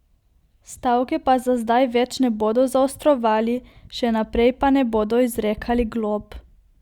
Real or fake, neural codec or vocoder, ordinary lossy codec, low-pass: real; none; Opus, 64 kbps; 19.8 kHz